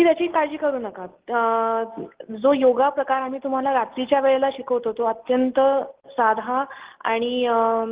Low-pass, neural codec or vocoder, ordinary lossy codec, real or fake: 3.6 kHz; none; Opus, 16 kbps; real